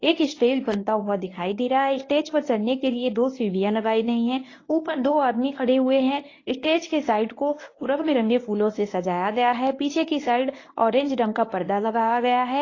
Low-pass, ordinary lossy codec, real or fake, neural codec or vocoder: 7.2 kHz; AAC, 32 kbps; fake; codec, 24 kHz, 0.9 kbps, WavTokenizer, medium speech release version 1